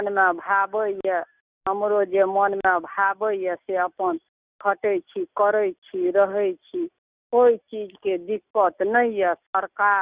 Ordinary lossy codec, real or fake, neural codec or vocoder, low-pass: Opus, 64 kbps; real; none; 3.6 kHz